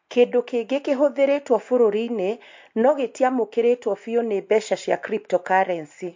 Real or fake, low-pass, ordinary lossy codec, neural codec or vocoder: real; 7.2 kHz; MP3, 48 kbps; none